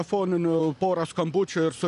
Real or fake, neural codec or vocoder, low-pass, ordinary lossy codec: real; none; 10.8 kHz; MP3, 96 kbps